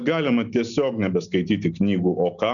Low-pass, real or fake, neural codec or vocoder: 7.2 kHz; real; none